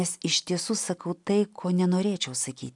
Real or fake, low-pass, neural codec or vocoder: real; 10.8 kHz; none